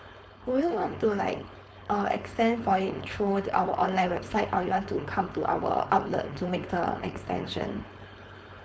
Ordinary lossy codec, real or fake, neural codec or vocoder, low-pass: none; fake; codec, 16 kHz, 4.8 kbps, FACodec; none